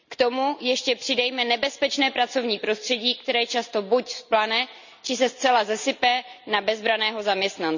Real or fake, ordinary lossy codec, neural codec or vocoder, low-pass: real; none; none; 7.2 kHz